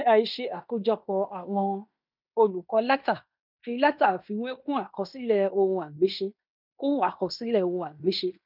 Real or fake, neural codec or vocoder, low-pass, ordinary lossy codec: fake; codec, 16 kHz in and 24 kHz out, 0.9 kbps, LongCat-Audio-Codec, fine tuned four codebook decoder; 5.4 kHz; none